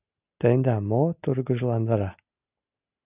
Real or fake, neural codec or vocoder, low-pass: real; none; 3.6 kHz